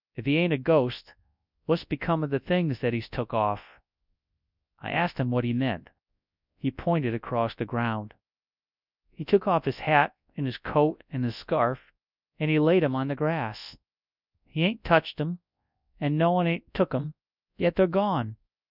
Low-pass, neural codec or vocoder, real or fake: 5.4 kHz; codec, 24 kHz, 0.9 kbps, WavTokenizer, large speech release; fake